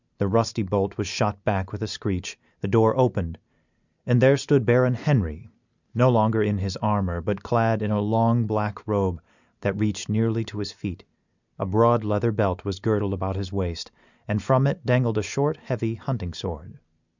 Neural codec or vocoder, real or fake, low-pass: none; real; 7.2 kHz